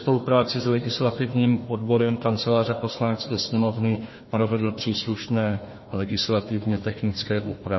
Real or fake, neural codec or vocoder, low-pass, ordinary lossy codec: fake; codec, 16 kHz, 1 kbps, FunCodec, trained on Chinese and English, 50 frames a second; 7.2 kHz; MP3, 24 kbps